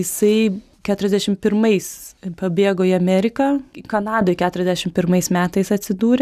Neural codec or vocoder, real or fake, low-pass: none; real; 14.4 kHz